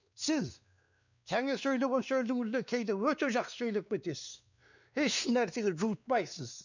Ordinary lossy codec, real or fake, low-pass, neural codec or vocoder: none; fake; 7.2 kHz; codec, 16 kHz, 2 kbps, X-Codec, WavLM features, trained on Multilingual LibriSpeech